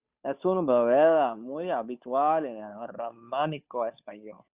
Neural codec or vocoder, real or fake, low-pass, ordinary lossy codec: codec, 16 kHz, 2 kbps, FunCodec, trained on Chinese and English, 25 frames a second; fake; 3.6 kHz; Opus, 64 kbps